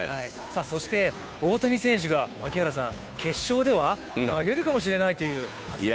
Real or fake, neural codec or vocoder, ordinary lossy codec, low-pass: fake; codec, 16 kHz, 2 kbps, FunCodec, trained on Chinese and English, 25 frames a second; none; none